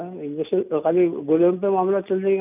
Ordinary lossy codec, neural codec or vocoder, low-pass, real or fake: none; none; 3.6 kHz; real